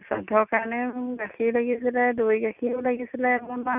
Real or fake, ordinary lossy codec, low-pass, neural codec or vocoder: real; none; 3.6 kHz; none